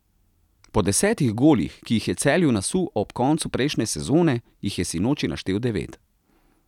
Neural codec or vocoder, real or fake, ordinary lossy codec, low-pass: none; real; none; 19.8 kHz